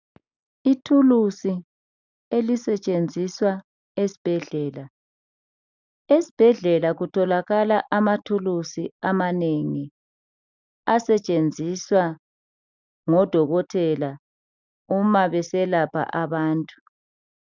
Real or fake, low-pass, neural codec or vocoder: real; 7.2 kHz; none